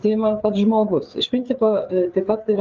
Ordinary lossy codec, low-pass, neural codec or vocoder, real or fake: Opus, 32 kbps; 7.2 kHz; codec, 16 kHz, 4 kbps, FreqCodec, larger model; fake